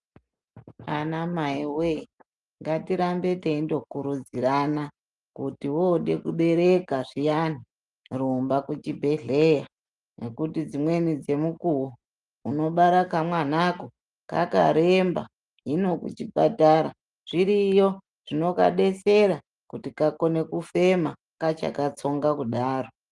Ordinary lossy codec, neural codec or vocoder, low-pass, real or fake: Opus, 32 kbps; none; 10.8 kHz; real